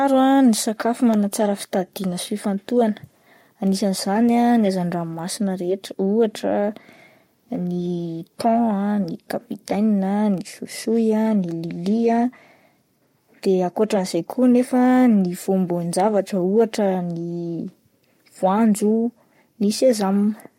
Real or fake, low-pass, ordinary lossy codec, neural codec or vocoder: fake; 19.8 kHz; MP3, 64 kbps; codec, 44.1 kHz, 7.8 kbps, Pupu-Codec